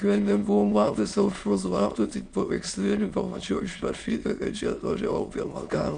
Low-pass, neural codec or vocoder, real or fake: 9.9 kHz; autoencoder, 22.05 kHz, a latent of 192 numbers a frame, VITS, trained on many speakers; fake